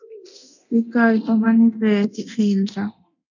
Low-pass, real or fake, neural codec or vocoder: 7.2 kHz; fake; codec, 24 kHz, 0.9 kbps, DualCodec